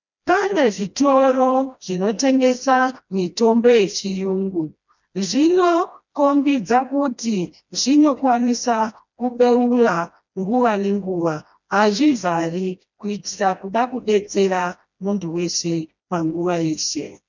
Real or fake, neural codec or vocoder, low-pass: fake; codec, 16 kHz, 1 kbps, FreqCodec, smaller model; 7.2 kHz